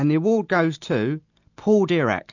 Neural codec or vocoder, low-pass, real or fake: none; 7.2 kHz; real